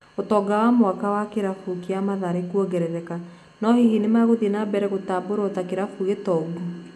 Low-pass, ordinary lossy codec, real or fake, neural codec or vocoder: 14.4 kHz; none; real; none